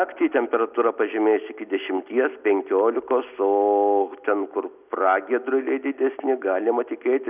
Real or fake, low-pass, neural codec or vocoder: real; 3.6 kHz; none